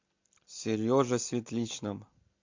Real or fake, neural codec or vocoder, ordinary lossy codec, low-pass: real; none; MP3, 48 kbps; 7.2 kHz